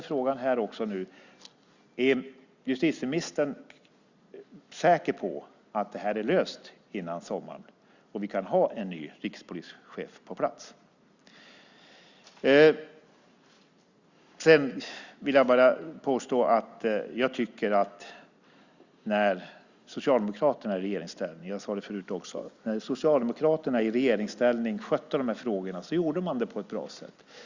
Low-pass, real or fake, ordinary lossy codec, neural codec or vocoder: 7.2 kHz; real; Opus, 64 kbps; none